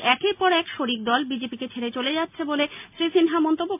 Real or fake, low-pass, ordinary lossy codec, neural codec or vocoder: real; 3.6 kHz; MP3, 32 kbps; none